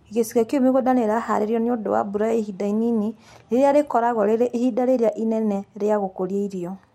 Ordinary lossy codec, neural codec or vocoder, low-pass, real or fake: MP3, 64 kbps; none; 19.8 kHz; real